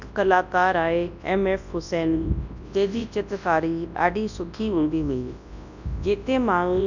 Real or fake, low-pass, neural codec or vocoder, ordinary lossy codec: fake; 7.2 kHz; codec, 24 kHz, 0.9 kbps, WavTokenizer, large speech release; none